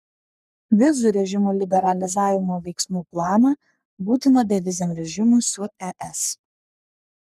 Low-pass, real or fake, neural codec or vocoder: 14.4 kHz; fake; codec, 44.1 kHz, 3.4 kbps, Pupu-Codec